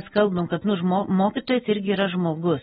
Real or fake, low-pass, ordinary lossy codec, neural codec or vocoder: real; 19.8 kHz; AAC, 16 kbps; none